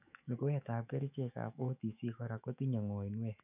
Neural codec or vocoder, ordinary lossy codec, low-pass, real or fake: autoencoder, 48 kHz, 128 numbers a frame, DAC-VAE, trained on Japanese speech; none; 3.6 kHz; fake